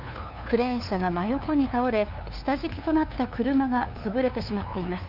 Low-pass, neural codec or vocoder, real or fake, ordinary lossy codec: 5.4 kHz; codec, 16 kHz, 2 kbps, FunCodec, trained on LibriTTS, 25 frames a second; fake; none